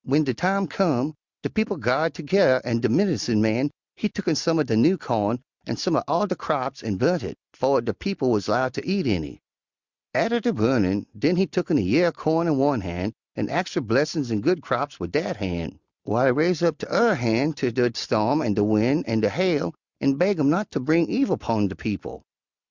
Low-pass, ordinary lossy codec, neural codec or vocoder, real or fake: 7.2 kHz; Opus, 64 kbps; none; real